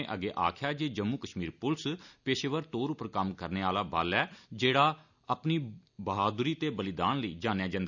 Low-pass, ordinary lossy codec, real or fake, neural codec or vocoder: 7.2 kHz; none; real; none